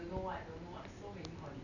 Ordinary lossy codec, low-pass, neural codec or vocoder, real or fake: none; 7.2 kHz; none; real